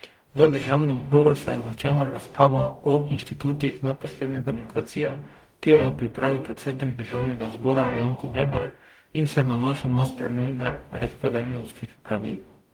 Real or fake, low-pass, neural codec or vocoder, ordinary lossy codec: fake; 19.8 kHz; codec, 44.1 kHz, 0.9 kbps, DAC; Opus, 32 kbps